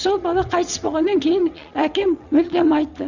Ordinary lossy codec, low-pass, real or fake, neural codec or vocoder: none; 7.2 kHz; fake; vocoder, 22.05 kHz, 80 mel bands, WaveNeXt